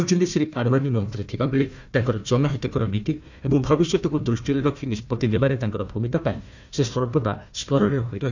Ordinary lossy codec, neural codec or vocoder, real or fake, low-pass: none; codec, 16 kHz, 1 kbps, FunCodec, trained on Chinese and English, 50 frames a second; fake; 7.2 kHz